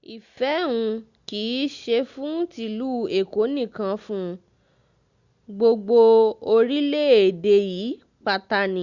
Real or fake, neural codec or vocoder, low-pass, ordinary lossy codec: real; none; 7.2 kHz; Opus, 64 kbps